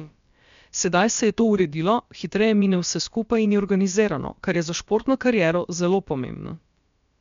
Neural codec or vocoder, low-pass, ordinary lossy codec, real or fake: codec, 16 kHz, about 1 kbps, DyCAST, with the encoder's durations; 7.2 kHz; MP3, 64 kbps; fake